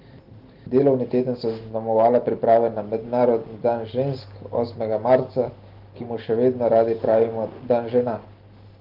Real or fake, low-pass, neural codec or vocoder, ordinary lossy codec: real; 5.4 kHz; none; Opus, 16 kbps